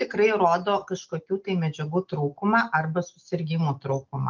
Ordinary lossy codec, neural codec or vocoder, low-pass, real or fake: Opus, 24 kbps; none; 7.2 kHz; real